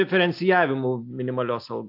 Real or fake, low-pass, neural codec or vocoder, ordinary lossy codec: real; 5.4 kHz; none; AAC, 48 kbps